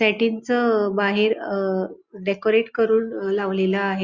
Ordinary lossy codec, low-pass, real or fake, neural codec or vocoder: Opus, 64 kbps; 7.2 kHz; real; none